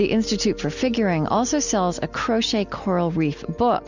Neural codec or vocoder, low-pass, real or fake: none; 7.2 kHz; real